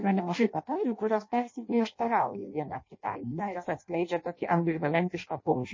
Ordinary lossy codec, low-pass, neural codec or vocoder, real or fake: MP3, 32 kbps; 7.2 kHz; codec, 16 kHz in and 24 kHz out, 0.6 kbps, FireRedTTS-2 codec; fake